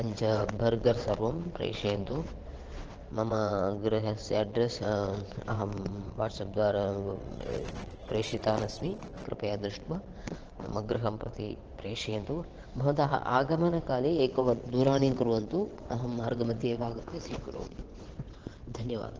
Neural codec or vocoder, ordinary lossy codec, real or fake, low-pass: vocoder, 22.05 kHz, 80 mel bands, WaveNeXt; Opus, 16 kbps; fake; 7.2 kHz